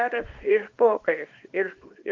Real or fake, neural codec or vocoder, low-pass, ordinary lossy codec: fake; codec, 24 kHz, 0.9 kbps, WavTokenizer, small release; 7.2 kHz; Opus, 32 kbps